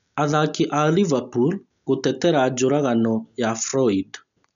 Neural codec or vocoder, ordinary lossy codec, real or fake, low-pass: none; none; real; 7.2 kHz